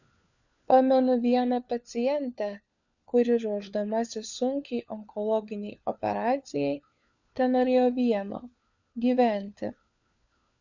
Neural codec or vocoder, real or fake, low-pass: codec, 16 kHz, 4 kbps, FunCodec, trained on LibriTTS, 50 frames a second; fake; 7.2 kHz